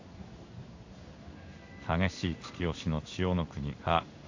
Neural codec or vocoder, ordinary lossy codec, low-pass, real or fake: codec, 16 kHz in and 24 kHz out, 1 kbps, XY-Tokenizer; AAC, 48 kbps; 7.2 kHz; fake